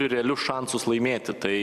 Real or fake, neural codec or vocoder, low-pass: real; none; 14.4 kHz